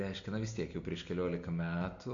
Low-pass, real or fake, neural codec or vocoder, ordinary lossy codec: 7.2 kHz; real; none; MP3, 48 kbps